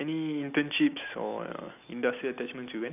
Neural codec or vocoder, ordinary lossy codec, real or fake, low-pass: none; none; real; 3.6 kHz